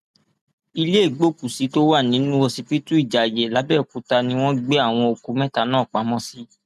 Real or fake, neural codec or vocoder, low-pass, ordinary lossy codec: real; none; 14.4 kHz; none